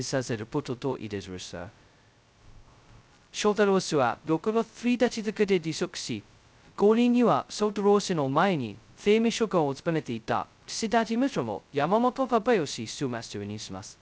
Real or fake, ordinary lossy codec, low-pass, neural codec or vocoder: fake; none; none; codec, 16 kHz, 0.2 kbps, FocalCodec